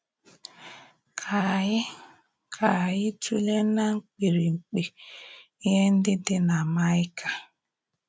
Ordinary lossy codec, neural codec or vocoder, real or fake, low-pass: none; none; real; none